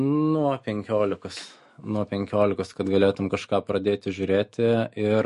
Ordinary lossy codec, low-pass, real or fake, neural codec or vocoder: MP3, 48 kbps; 14.4 kHz; fake; autoencoder, 48 kHz, 128 numbers a frame, DAC-VAE, trained on Japanese speech